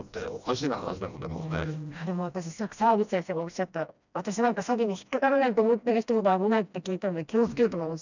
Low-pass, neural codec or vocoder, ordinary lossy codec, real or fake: 7.2 kHz; codec, 16 kHz, 1 kbps, FreqCodec, smaller model; none; fake